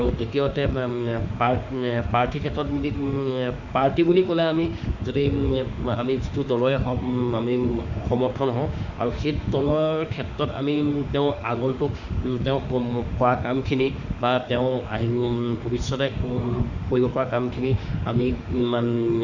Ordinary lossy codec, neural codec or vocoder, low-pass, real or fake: none; autoencoder, 48 kHz, 32 numbers a frame, DAC-VAE, trained on Japanese speech; 7.2 kHz; fake